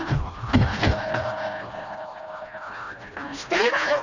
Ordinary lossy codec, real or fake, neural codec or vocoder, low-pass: none; fake; codec, 16 kHz, 1 kbps, FreqCodec, smaller model; 7.2 kHz